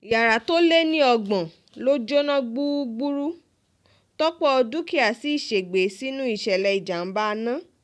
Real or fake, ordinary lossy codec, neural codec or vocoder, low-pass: real; none; none; none